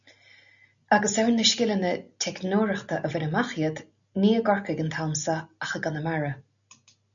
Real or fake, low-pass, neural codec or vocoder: real; 7.2 kHz; none